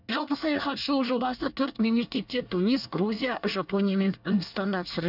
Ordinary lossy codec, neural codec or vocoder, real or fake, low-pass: none; codec, 24 kHz, 1 kbps, SNAC; fake; 5.4 kHz